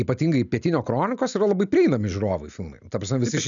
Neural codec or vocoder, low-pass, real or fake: none; 7.2 kHz; real